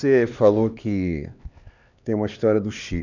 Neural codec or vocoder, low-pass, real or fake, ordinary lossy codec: codec, 16 kHz, 2 kbps, X-Codec, HuBERT features, trained on LibriSpeech; 7.2 kHz; fake; none